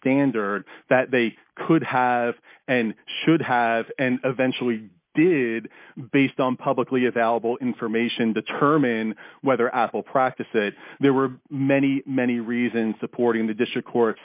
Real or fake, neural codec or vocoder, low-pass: real; none; 3.6 kHz